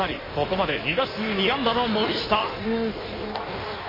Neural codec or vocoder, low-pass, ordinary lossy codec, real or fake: codec, 16 kHz in and 24 kHz out, 1.1 kbps, FireRedTTS-2 codec; 5.4 kHz; MP3, 24 kbps; fake